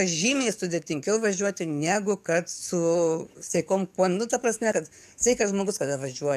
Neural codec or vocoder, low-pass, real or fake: codec, 44.1 kHz, 7.8 kbps, DAC; 14.4 kHz; fake